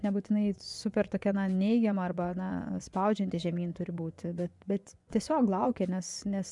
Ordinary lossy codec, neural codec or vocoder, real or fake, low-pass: MP3, 96 kbps; vocoder, 24 kHz, 100 mel bands, Vocos; fake; 10.8 kHz